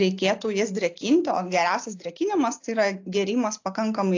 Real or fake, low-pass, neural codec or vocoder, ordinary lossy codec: real; 7.2 kHz; none; AAC, 48 kbps